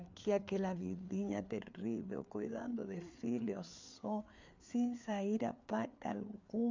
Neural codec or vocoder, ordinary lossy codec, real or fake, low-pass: codec, 16 kHz, 4 kbps, FreqCodec, larger model; MP3, 64 kbps; fake; 7.2 kHz